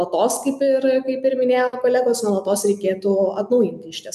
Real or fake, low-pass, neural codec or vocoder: real; 14.4 kHz; none